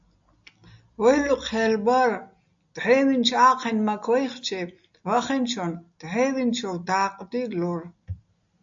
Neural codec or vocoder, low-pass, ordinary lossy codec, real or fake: none; 7.2 kHz; AAC, 64 kbps; real